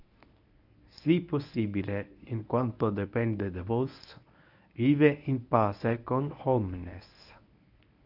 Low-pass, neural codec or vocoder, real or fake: 5.4 kHz; codec, 24 kHz, 0.9 kbps, WavTokenizer, medium speech release version 1; fake